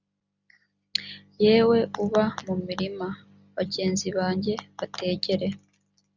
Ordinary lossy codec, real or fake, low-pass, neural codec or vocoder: Opus, 64 kbps; real; 7.2 kHz; none